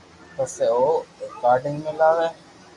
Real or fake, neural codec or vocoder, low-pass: real; none; 10.8 kHz